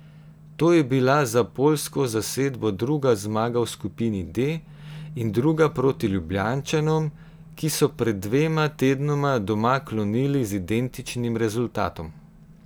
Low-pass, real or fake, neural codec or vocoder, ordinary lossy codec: none; real; none; none